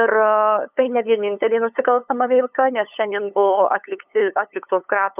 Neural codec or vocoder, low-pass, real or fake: codec, 16 kHz, 8 kbps, FunCodec, trained on LibriTTS, 25 frames a second; 3.6 kHz; fake